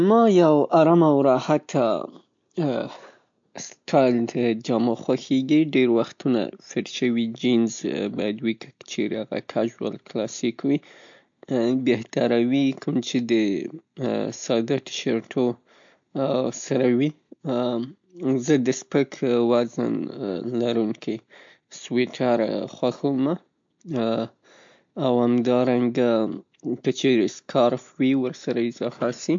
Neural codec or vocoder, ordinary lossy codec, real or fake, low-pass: none; MP3, 48 kbps; real; 7.2 kHz